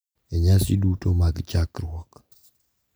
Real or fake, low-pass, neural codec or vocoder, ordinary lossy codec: real; none; none; none